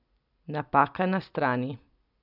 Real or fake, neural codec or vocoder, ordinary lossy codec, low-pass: real; none; none; 5.4 kHz